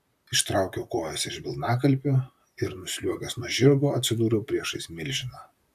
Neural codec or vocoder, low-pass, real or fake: vocoder, 44.1 kHz, 128 mel bands, Pupu-Vocoder; 14.4 kHz; fake